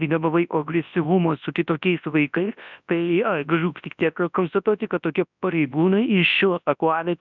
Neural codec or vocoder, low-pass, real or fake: codec, 24 kHz, 0.9 kbps, WavTokenizer, large speech release; 7.2 kHz; fake